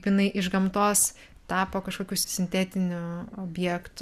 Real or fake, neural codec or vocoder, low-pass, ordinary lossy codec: real; none; 14.4 kHz; MP3, 96 kbps